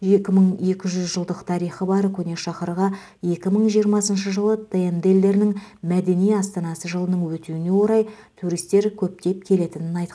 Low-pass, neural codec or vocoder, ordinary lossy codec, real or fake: none; none; none; real